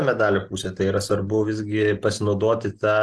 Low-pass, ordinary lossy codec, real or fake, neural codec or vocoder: 10.8 kHz; Opus, 16 kbps; real; none